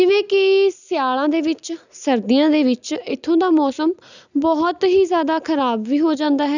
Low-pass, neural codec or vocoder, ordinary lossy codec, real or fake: 7.2 kHz; none; none; real